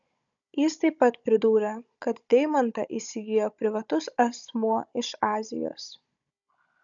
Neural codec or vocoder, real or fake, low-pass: codec, 16 kHz, 16 kbps, FunCodec, trained on Chinese and English, 50 frames a second; fake; 7.2 kHz